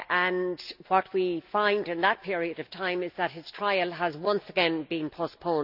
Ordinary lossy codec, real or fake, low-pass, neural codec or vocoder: none; real; 5.4 kHz; none